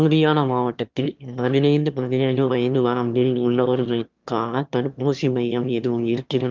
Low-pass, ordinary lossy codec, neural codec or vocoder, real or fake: 7.2 kHz; Opus, 32 kbps; autoencoder, 22.05 kHz, a latent of 192 numbers a frame, VITS, trained on one speaker; fake